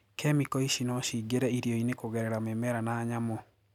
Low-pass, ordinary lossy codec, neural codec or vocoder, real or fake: 19.8 kHz; none; none; real